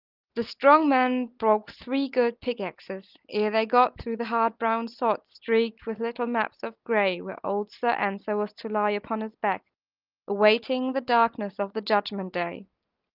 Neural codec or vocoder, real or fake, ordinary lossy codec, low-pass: none; real; Opus, 24 kbps; 5.4 kHz